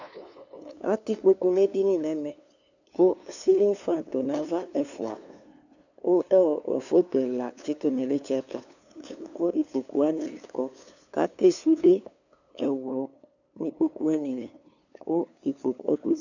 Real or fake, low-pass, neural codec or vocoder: fake; 7.2 kHz; codec, 16 kHz, 2 kbps, FunCodec, trained on LibriTTS, 25 frames a second